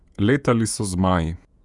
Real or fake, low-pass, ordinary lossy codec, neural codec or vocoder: fake; 10.8 kHz; none; vocoder, 48 kHz, 128 mel bands, Vocos